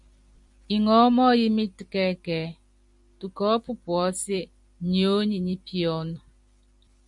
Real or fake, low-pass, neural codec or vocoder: fake; 10.8 kHz; vocoder, 44.1 kHz, 128 mel bands every 256 samples, BigVGAN v2